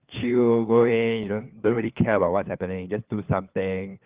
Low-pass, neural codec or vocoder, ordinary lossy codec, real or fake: 3.6 kHz; codec, 16 kHz, 4 kbps, FunCodec, trained on LibriTTS, 50 frames a second; Opus, 24 kbps; fake